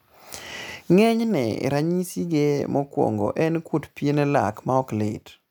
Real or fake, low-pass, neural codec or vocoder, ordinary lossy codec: real; none; none; none